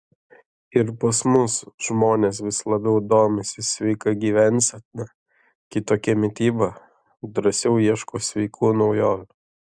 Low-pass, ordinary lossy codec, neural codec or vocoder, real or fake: 9.9 kHz; Opus, 64 kbps; none; real